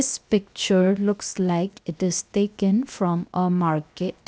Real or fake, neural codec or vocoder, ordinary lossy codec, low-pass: fake; codec, 16 kHz, 0.7 kbps, FocalCodec; none; none